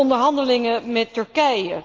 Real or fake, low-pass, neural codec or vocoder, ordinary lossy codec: real; 7.2 kHz; none; Opus, 32 kbps